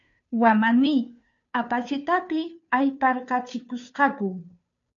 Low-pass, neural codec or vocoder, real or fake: 7.2 kHz; codec, 16 kHz, 2 kbps, FunCodec, trained on Chinese and English, 25 frames a second; fake